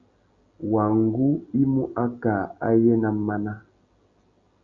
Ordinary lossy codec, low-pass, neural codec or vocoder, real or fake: Opus, 64 kbps; 7.2 kHz; none; real